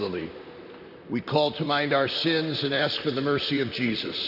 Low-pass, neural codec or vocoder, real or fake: 5.4 kHz; vocoder, 44.1 kHz, 128 mel bands, Pupu-Vocoder; fake